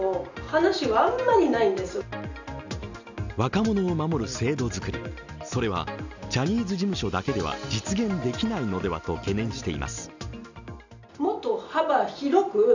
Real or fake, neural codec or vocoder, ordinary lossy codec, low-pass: real; none; none; 7.2 kHz